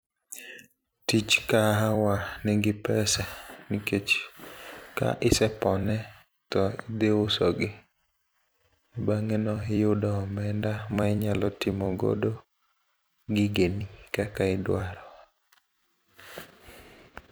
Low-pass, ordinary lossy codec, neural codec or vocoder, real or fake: none; none; vocoder, 44.1 kHz, 128 mel bands every 256 samples, BigVGAN v2; fake